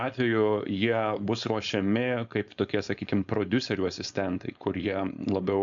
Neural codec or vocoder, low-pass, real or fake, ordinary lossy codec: codec, 16 kHz, 4.8 kbps, FACodec; 7.2 kHz; fake; MP3, 64 kbps